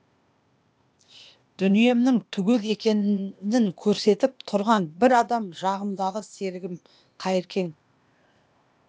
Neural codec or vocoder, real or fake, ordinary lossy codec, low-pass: codec, 16 kHz, 0.8 kbps, ZipCodec; fake; none; none